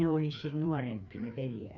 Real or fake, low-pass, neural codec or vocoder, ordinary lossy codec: fake; 7.2 kHz; codec, 16 kHz, 2 kbps, FreqCodec, larger model; none